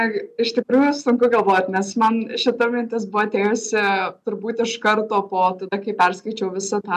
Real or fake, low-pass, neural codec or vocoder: real; 14.4 kHz; none